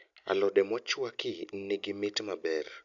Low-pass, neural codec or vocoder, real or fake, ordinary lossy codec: 7.2 kHz; none; real; none